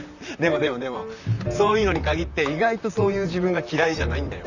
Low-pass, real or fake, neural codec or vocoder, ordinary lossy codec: 7.2 kHz; fake; vocoder, 44.1 kHz, 128 mel bands, Pupu-Vocoder; none